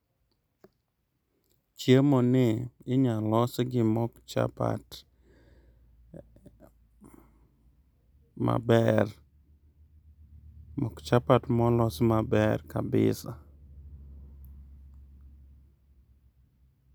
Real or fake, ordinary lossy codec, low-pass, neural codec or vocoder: real; none; none; none